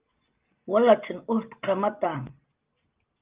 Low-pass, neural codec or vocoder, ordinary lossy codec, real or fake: 3.6 kHz; none; Opus, 32 kbps; real